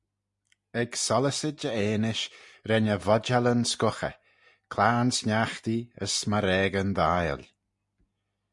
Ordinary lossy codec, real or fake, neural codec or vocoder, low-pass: MP3, 64 kbps; real; none; 10.8 kHz